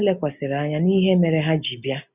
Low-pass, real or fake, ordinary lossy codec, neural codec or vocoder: 3.6 kHz; real; none; none